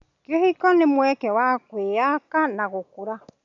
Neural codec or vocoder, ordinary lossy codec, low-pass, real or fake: none; none; 7.2 kHz; real